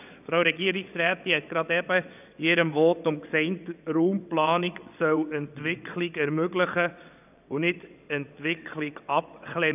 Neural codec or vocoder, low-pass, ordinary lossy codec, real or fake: vocoder, 22.05 kHz, 80 mel bands, WaveNeXt; 3.6 kHz; none; fake